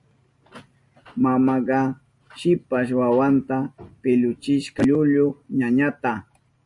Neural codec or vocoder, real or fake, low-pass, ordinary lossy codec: none; real; 10.8 kHz; MP3, 48 kbps